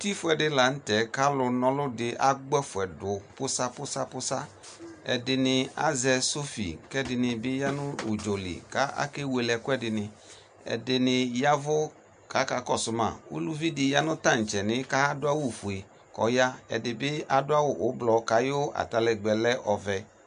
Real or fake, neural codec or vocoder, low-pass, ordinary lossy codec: real; none; 9.9 kHz; MP3, 64 kbps